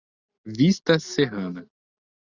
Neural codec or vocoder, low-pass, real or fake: none; 7.2 kHz; real